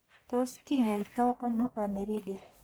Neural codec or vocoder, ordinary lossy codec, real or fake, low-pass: codec, 44.1 kHz, 1.7 kbps, Pupu-Codec; none; fake; none